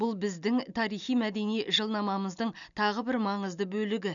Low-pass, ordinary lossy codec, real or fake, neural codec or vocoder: 7.2 kHz; none; real; none